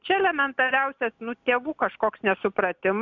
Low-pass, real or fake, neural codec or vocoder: 7.2 kHz; real; none